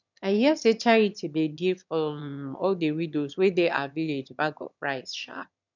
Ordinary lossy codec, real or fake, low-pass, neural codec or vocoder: none; fake; 7.2 kHz; autoencoder, 22.05 kHz, a latent of 192 numbers a frame, VITS, trained on one speaker